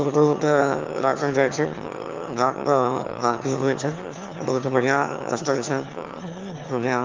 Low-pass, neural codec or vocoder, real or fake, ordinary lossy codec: 7.2 kHz; autoencoder, 22.05 kHz, a latent of 192 numbers a frame, VITS, trained on one speaker; fake; Opus, 32 kbps